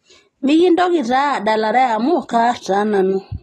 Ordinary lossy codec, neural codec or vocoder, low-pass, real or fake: AAC, 32 kbps; none; 19.8 kHz; real